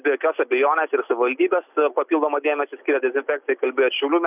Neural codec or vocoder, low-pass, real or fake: none; 3.6 kHz; real